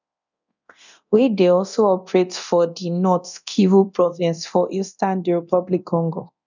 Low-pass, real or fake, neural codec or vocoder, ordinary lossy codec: 7.2 kHz; fake; codec, 24 kHz, 0.9 kbps, DualCodec; none